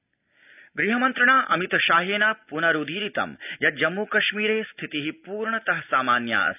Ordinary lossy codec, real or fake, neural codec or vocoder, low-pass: none; real; none; 3.6 kHz